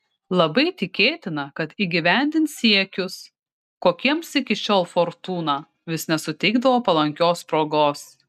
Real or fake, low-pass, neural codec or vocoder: real; 14.4 kHz; none